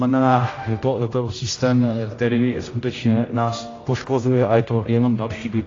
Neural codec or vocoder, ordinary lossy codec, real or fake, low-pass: codec, 16 kHz, 0.5 kbps, X-Codec, HuBERT features, trained on general audio; AAC, 32 kbps; fake; 7.2 kHz